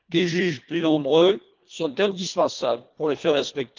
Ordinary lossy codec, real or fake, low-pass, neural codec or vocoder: Opus, 24 kbps; fake; 7.2 kHz; codec, 24 kHz, 1.5 kbps, HILCodec